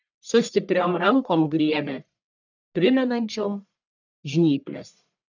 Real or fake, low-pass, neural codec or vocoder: fake; 7.2 kHz; codec, 44.1 kHz, 1.7 kbps, Pupu-Codec